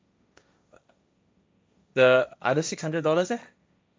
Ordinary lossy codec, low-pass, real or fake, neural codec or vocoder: none; none; fake; codec, 16 kHz, 1.1 kbps, Voila-Tokenizer